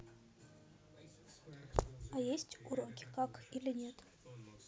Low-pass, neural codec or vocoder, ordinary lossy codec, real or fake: none; none; none; real